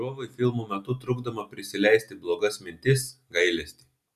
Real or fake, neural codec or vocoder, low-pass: real; none; 14.4 kHz